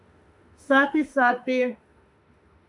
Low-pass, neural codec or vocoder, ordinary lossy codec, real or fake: 10.8 kHz; autoencoder, 48 kHz, 32 numbers a frame, DAC-VAE, trained on Japanese speech; MP3, 96 kbps; fake